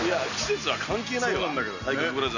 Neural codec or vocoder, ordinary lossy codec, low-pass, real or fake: none; none; 7.2 kHz; real